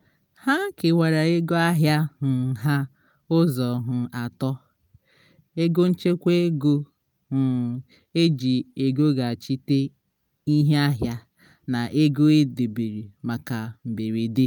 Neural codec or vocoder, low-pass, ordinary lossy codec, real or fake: none; none; none; real